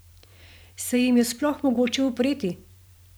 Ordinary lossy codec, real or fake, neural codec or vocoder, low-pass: none; fake; vocoder, 44.1 kHz, 128 mel bands every 512 samples, BigVGAN v2; none